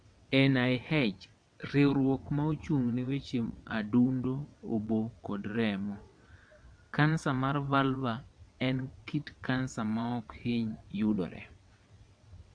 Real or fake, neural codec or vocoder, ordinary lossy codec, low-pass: fake; vocoder, 22.05 kHz, 80 mel bands, WaveNeXt; MP3, 64 kbps; 9.9 kHz